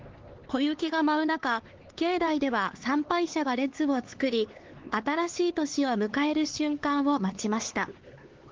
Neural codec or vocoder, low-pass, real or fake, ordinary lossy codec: codec, 16 kHz, 4 kbps, X-Codec, HuBERT features, trained on LibriSpeech; 7.2 kHz; fake; Opus, 16 kbps